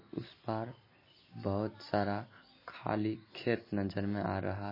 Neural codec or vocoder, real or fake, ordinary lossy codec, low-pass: none; real; MP3, 24 kbps; 5.4 kHz